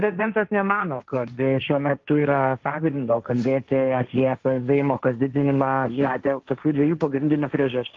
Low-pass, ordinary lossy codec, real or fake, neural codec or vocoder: 7.2 kHz; Opus, 32 kbps; fake; codec, 16 kHz, 1.1 kbps, Voila-Tokenizer